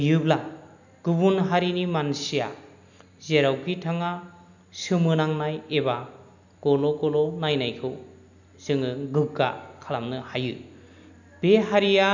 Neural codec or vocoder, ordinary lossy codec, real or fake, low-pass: none; none; real; 7.2 kHz